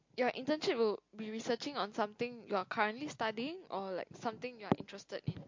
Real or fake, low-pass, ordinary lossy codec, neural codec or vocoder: real; 7.2 kHz; MP3, 48 kbps; none